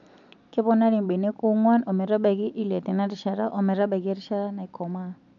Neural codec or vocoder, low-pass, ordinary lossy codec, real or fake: none; 7.2 kHz; none; real